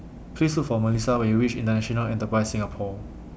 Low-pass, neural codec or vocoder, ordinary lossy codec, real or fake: none; none; none; real